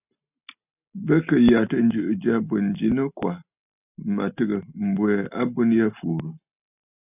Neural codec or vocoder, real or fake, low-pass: none; real; 3.6 kHz